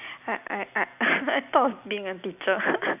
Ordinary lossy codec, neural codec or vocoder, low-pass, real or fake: none; none; 3.6 kHz; real